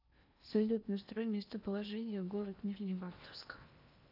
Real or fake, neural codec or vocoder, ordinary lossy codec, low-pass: fake; codec, 16 kHz in and 24 kHz out, 0.8 kbps, FocalCodec, streaming, 65536 codes; none; 5.4 kHz